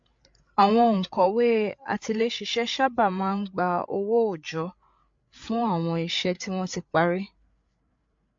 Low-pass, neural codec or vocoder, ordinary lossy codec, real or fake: 7.2 kHz; codec, 16 kHz, 8 kbps, FreqCodec, larger model; MP3, 48 kbps; fake